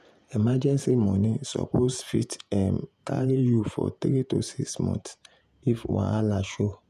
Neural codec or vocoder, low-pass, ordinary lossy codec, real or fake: vocoder, 48 kHz, 128 mel bands, Vocos; 14.4 kHz; none; fake